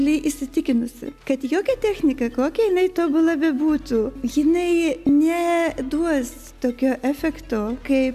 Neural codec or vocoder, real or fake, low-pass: none; real; 14.4 kHz